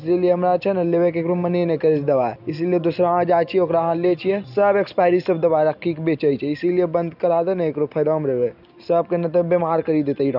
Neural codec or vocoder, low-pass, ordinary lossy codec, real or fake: none; 5.4 kHz; none; real